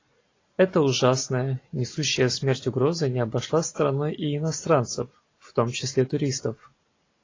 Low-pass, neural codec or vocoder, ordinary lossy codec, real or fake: 7.2 kHz; none; AAC, 32 kbps; real